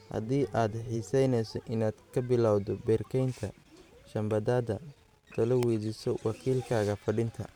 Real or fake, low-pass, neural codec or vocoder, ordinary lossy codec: fake; 19.8 kHz; vocoder, 44.1 kHz, 128 mel bands every 512 samples, BigVGAN v2; none